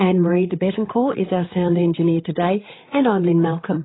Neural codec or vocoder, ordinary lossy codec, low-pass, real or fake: codec, 16 kHz, 8 kbps, FreqCodec, larger model; AAC, 16 kbps; 7.2 kHz; fake